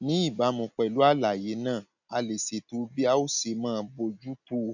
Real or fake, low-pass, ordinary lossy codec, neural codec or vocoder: real; 7.2 kHz; none; none